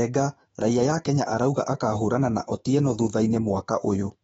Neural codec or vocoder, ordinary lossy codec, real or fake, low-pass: autoencoder, 48 kHz, 128 numbers a frame, DAC-VAE, trained on Japanese speech; AAC, 24 kbps; fake; 19.8 kHz